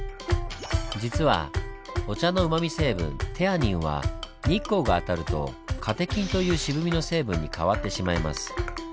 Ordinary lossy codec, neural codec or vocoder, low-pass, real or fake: none; none; none; real